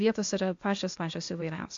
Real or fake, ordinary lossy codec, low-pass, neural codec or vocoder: fake; AAC, 48 kbps; 7.2 kHz; codec, 16 kHz, 0.8 kbps, ZipCodec